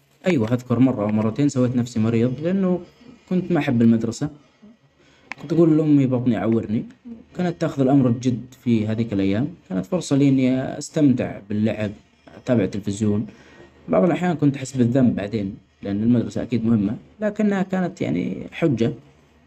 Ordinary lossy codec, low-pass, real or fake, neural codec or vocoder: none; 14.4 kHz; real; none